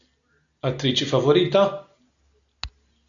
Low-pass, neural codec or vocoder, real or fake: 7.2 kHz; none; real